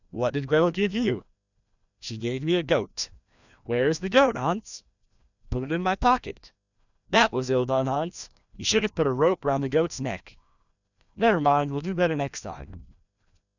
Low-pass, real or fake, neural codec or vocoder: 7.2 kHz; fake; codec, 16 kHz, 1 kbps, FreqCodec, larger model